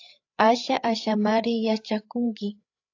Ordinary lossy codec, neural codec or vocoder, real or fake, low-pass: AAC, 48 kbps; codec, 16 kHz, 8 kbps, FreqCodec, larger model; fake; 7.2 kHz